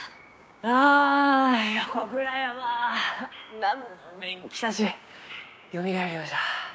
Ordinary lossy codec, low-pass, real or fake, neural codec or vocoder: none; none; fake; codec, 16 kHz, 6 kbps, DAC